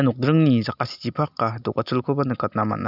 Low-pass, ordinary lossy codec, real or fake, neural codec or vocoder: 5.4 kHz; none; real; none